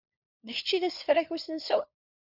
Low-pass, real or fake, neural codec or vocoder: 5.4 kHz; fake; codec, 16 kHz, 4 kbps, FunCodec, trained on LibriTTS, 50 frames a second